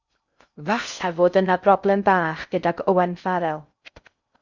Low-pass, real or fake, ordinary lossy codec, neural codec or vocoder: 7.2 kHz; fake; Opus, 64 kbps; codec, 16 kHz in and 24 kHz out, 0.6 kbps, FocalCodec, streaming, 2048 codes